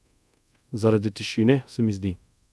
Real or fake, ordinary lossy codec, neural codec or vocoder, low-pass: fake; none; codec, 24 kHz, 0.5 kbps, DualCodec; none